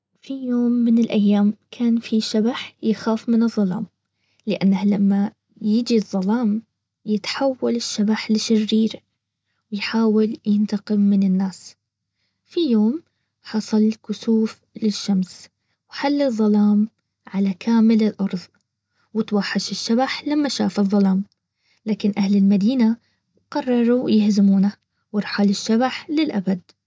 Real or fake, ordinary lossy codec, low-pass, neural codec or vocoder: real; none; none; none